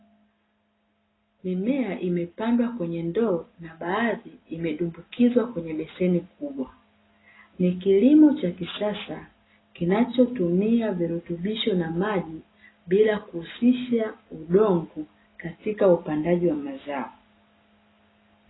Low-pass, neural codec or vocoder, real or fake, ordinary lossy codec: 7.2 kHz; none; real; AAC, 16 kbps